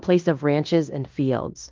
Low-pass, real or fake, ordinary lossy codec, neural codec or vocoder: 7.2 kHz; fake; Opus, 24 kbps; codec, 16 kHz in and 24 kHz out, 0.9 kbps, LongCat-Audio-Codec, fine tuned four codebook decoder